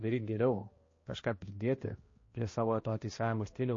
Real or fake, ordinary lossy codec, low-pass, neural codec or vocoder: fake; MP3, 32 kbps; 7.2 kHz; codec, 16 kHz, 1 kbps, X-Codec, HuBERT features, trained on balanced general audio